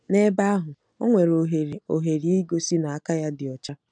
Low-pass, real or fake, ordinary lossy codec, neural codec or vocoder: 9.9 kHz; real; none; none